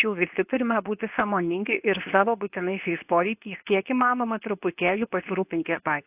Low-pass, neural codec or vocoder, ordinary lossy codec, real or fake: 3.6 kHz; codec, 24 kHz, 0.9 kbps, WavTokenizer, medium speech release version 2; AAC, 32 kbps; fake